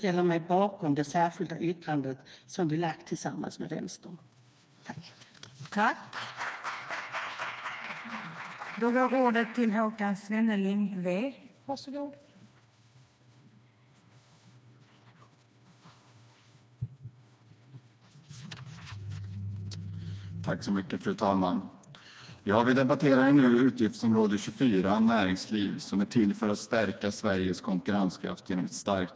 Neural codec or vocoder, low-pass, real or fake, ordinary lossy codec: codec, 16 kHz, 2 kbps, FreqCodec, smaller model; none; fake; none